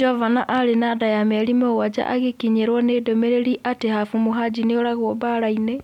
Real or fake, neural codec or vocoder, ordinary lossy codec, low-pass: real; none; MP3, 96 kbps; 19.8 kHz